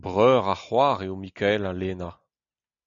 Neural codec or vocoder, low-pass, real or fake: none; 7.2 kHz; real